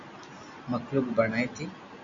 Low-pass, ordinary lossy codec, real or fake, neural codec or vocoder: 7.2 kHz; MP3, 48 kbps; real; none